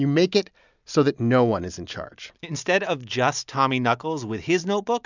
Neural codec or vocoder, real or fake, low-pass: none; real; 7.2 kHz